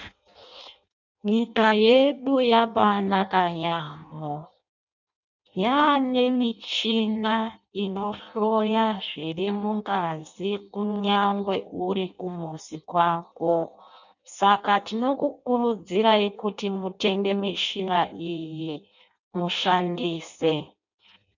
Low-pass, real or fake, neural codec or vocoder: 7.2 kHz; fake; codec, 16 kHz in and 24 kHz out, 0.6 kbps, FireRedTTS-2 codec